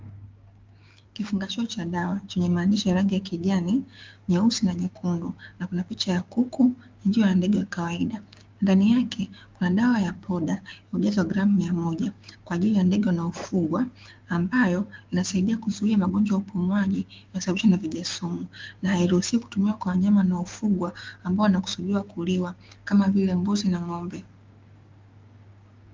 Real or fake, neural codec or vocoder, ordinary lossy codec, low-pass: fake; codec, 16 kHz, 6 kbps, DAC; Opus, 32 kbps; 7.2 kHz